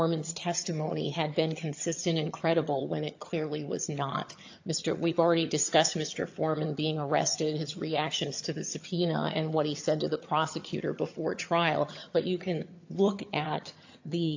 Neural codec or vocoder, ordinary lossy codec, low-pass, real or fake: vocoder, 22.05 kHz, 80 mel bands, HiFi-GAN; AAC, 48 kbps; 7.2 kHz; fake